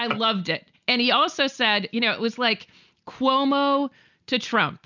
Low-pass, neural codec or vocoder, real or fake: 7.2 kHz; none; real